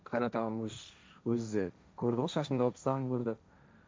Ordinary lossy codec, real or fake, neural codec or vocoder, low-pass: none; fake; codec, 16 kHz, 1.1 kbps, Voila-Tokenizer; none